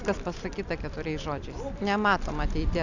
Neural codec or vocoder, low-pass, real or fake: none; 7.2 kHz; real